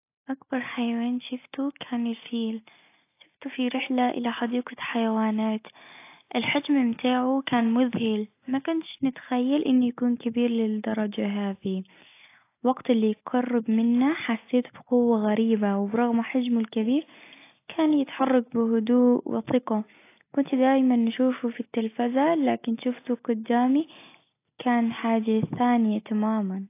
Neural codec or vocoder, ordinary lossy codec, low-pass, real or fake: none; AAC, 24 kbps; 3.6 kHz; real